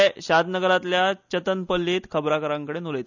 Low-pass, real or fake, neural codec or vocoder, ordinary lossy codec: 7.2 kHz; real; none; none